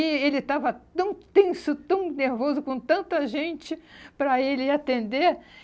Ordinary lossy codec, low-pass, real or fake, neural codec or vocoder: none; none; real; none